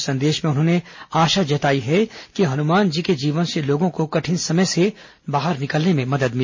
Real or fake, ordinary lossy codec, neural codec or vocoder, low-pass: real; MP3, 32 kbps; none; 7.2 kHz